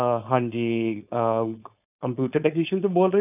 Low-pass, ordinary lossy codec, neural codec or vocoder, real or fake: 3.6 kHz; none; codec, 16 kHz, 4.8 kbps, FACodec; fake